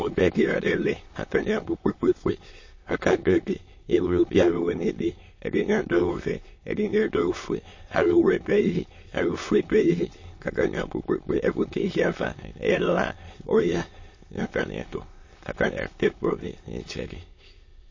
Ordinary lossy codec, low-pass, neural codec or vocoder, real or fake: MP3, 32 kbps; 7.2 kHz; autoencoder, 22.05 kHz, a latent of 192 numbers a frame, VITS, trained on many speakers; fake